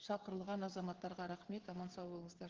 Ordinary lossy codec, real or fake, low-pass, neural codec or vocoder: Opus, 16 kbps; fake; 7.2 kHz; codec, 16 kHz, 16 kbps, FreqCodec, smaller model